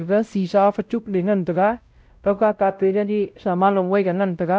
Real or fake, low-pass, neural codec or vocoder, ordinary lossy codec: fake; none; codec, 16 kHz, 0.5 kbps, X-Codec, WavLM features, trained on Multilingual LibriSpeech; none